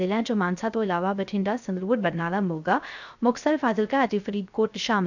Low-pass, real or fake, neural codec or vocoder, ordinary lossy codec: 7.2 kHz; fake; codec, 16 kHz, 0.3 kbps, FocalCodec; none